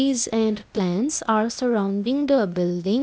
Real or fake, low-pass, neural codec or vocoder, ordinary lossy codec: fake; none; codec, 16 kHz, 0.8 kbps, ZipCodec; none